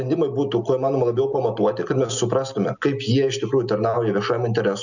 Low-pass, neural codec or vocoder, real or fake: 7.2 kHz; none; real